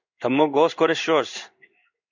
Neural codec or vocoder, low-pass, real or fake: codec, 16 kHz in and 24 kHz out, 1 kbps, XY-Tokenizer; 7.2 kHz; fake